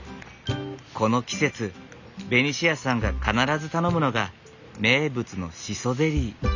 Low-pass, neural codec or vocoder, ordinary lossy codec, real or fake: 7.2 kHz; none; none; real